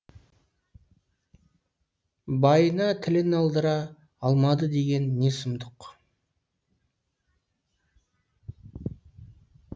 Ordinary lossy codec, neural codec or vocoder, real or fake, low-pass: none; none; real; none